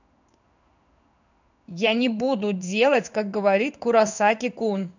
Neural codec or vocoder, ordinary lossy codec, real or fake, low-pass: codec, 16 kHz in and 24 kHz out, 1 kbps, XY-Tokenizer; none; fake; 7.2 kHz